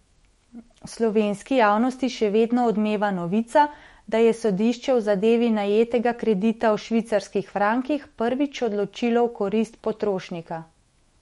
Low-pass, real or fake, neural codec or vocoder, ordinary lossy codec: 19.8 kHz; fake; autoencoder, 48 kHz, 128 numbers a frame, DAC-VAE, trained on Japanese speech; MP3, 48 kbps